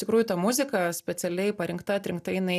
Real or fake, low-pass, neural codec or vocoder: real; 14.4 kHz; none